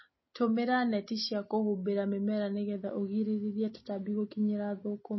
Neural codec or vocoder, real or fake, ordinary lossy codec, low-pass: none; real; MP3, 24 kbps; 7.2 kHz